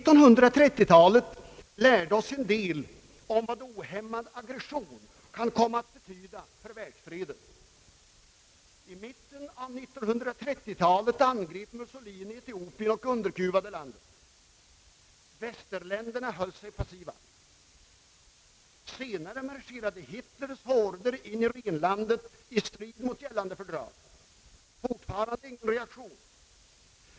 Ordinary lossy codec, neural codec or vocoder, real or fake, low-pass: none; none; real; none